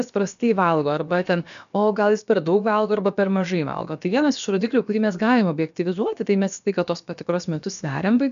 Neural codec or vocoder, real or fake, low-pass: codec, 16 kHz, about 1 kbps, DyCAST, with the encoder's durations; fake; 7.2 kHz